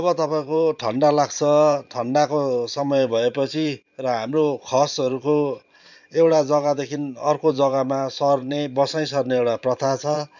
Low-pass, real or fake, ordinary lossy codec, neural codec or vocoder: 7.2 kHz; fake; none; vocoder, 44.1 kHz, 128 mel bands every 512 samples, BigVGAN v2